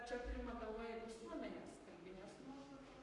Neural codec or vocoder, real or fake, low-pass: codec, 44.1 kHz, 7.8 kbps, Pupu-Codec; fake; 10.8 kHz